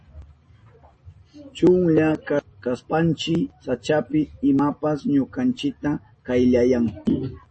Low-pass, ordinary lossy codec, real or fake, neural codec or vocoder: 10.8 kHz; MP3, 32 kbps; real; none